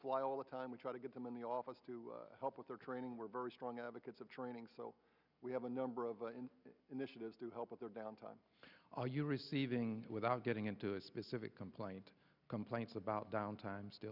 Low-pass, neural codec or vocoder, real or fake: 5.4 kHz; none; real